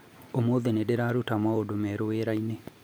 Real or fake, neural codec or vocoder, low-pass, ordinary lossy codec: real; none; none; none